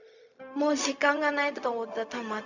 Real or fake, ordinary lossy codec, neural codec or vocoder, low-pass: fake; none; codec, 16 kHz, 0.4 kbps, LongCat-Audio-Codec; 7.2 kHz